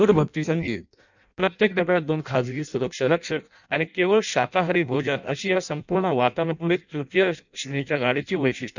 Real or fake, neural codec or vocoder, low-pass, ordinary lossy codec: fake; codec, 16 kHz in and 24 kHz out, 0.6 kbps, FireRedTTS-2 codec; 7.2 kHz; none